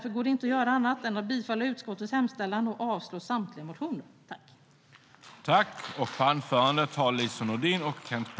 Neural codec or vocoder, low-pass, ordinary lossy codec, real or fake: none; none; none; real